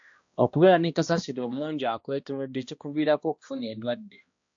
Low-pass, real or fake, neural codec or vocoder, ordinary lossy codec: 7.2 kHz; fake; codec, 16 kHz, 1 kbps, X-Codec, HuBERT features, trained on balanced general audio; AAC, 64 kbps